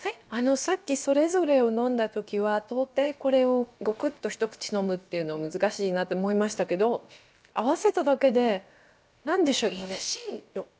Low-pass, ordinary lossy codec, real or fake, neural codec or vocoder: none; none; fake; codec, 16 kHz, about 1 kbps, DyCAST, with the encoder's durations